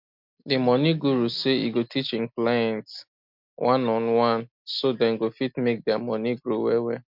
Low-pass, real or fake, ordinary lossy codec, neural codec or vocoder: 5.4 kHz; real; MP3, 48 kbps; none